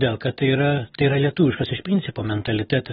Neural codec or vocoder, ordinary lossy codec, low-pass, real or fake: none; AAC, 16 kbps; 19.8 kHz; real